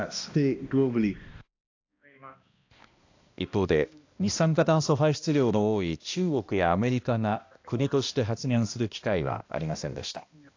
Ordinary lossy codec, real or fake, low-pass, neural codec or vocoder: AAC, 48 kbps; fake; 7.2 kHz; codec, 16 kHz, 1 kbps, X-Codec, HuBERT features, trained on balanced general audio